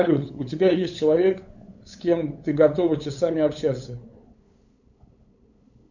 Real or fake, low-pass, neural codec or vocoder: fake; 7.2 kHz; codec, 16 kHz, 8 kbps, FunCodec, trained on LibriTTS, 25 frames a second